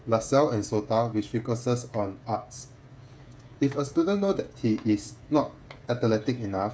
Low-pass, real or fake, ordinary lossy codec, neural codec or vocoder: none; fake; none; codec, 16 kHz, 8 kbps, FreqCodec, smaller model